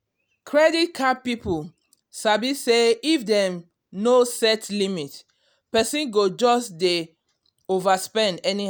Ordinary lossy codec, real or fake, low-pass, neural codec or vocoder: none; real; none; none